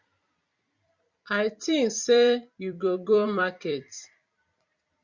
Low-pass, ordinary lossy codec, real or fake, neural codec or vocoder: 7.2 kHz; Opus, 64 kbps; fake; vocoder, 44.1 kHz, 128 mel bands every 256 samples, BigVGAN v2